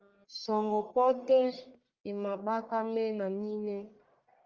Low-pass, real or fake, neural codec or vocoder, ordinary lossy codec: 7.2 kHz; fake; codec, 44.1 kHz, 1.7 kbps, Pupu-Codec; Opus, 32 kbps